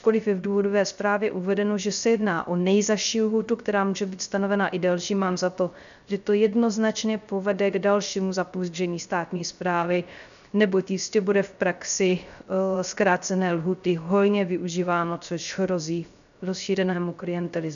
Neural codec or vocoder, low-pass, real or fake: codec, 16 kHz, 0.3 kbps, FocalCodec; 7.2 kHz; fake